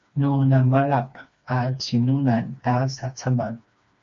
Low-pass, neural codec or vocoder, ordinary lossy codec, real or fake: 7.2 kHz; codec, 16 kHz, 2 kbps, FreqCodec, smaller model; MP3, 48 kbps; fake